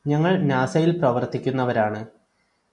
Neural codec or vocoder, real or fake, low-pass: none; real; 10.8 kHz